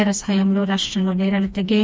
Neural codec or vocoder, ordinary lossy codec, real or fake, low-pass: codec, 16 kHz, 2 kbps, FreqCodec, smaller model; none; fake; none